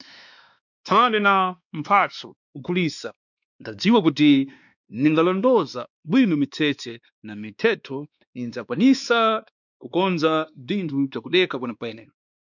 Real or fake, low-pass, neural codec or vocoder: fake; 7.2 kHz; codec, 16 kHz, 2 kbps, X-Codec, WavLM features, trained on Multilingual LibriSpeech